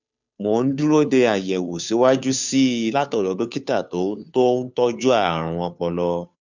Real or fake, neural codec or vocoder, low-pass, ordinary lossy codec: fake; codec, 16 kHz, 2 kbps, FunCodec, trained on Chinese and English, 25 frames a second; 7.2 kHz; none